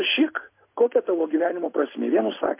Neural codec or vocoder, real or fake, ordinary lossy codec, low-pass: none; real; MP3, 24 kbps; 3.6 kHz